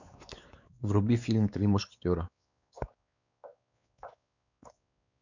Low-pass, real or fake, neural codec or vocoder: 7.2 kHz; fake; codec, 16 kHz, 4 kbps, X-Codec, WavLM features, trained on Multilingual LibriSpeech